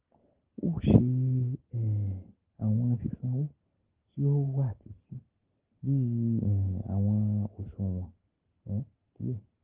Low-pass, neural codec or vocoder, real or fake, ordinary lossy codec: 3.6 kHz; none; real; Opus, 16 kbps